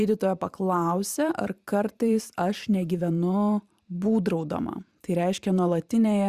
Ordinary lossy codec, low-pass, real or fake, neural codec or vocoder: Opus, 64 kbps; 14.4 kHz; fake; vocoder, 44.1 kHz, 128 mel bands every 512 samples, BigVGAN v2